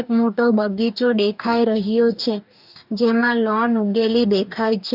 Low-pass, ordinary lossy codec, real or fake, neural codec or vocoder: 5.4 kHz; none; fake; codec, 44.1 kHz, 2.6 kbps, DAC